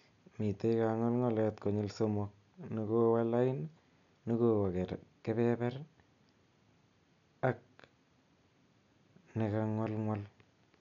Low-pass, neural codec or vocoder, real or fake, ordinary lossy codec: 7.2 kHz; none; real; none